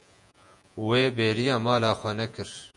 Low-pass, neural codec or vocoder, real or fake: 10.8 kHz; vocoder, 48 kHz, 128 mel bands, Vocos; fake